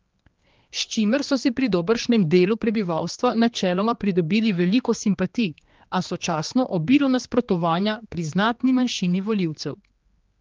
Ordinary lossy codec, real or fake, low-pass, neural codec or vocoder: Opus, 32 kbps; fake; 7.2 kHz; codec, 16 kHz, 2 kbps, X-Codec, HuBERT features, trained on general audio